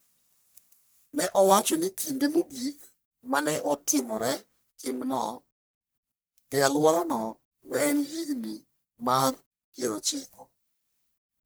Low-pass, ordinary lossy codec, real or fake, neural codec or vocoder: none; none; fake; codec, 44.1 kHz, 1.7 kbps, Pupu-Codec